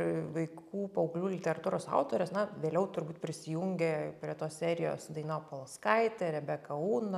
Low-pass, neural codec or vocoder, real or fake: 14.4 kHz; none; real